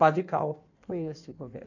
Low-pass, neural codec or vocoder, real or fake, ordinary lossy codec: 7.2 kHz; codec, 16 kHz in and 24 kHz out, 1.1 kbps, FireRedTTS-2 codec; fake; none